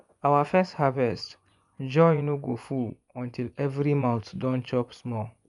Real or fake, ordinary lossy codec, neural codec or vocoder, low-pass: fake; none; vocoder, 24 kHz, 100 mel bands, Vocos; 10.8 kHz